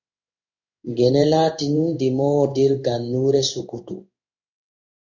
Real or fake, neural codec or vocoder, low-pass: fake; codec, 16 kHz in and 24 kHz out, 1 kbps, XY-Tokenizer; 7.2 kHz